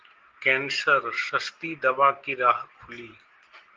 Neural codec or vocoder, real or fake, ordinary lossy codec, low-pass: none; real; Opus, 16 kbps; 7.2 kHz